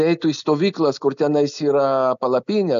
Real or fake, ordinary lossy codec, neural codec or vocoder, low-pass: real; AAC, 96 kbps; none; 7.2 kHz